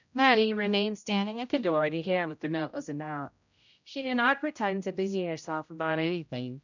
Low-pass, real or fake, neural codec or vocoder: 7.2 kHz; fake; codec, 16 kHz, 0.5 kbps, X-Codec, HuBERT features, trained on general audio